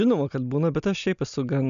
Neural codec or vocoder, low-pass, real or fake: none; 7.2 kHz; real